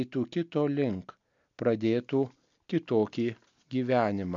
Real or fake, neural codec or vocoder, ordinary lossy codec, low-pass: real; none; MP3, 64 kbps; 7.2 kHz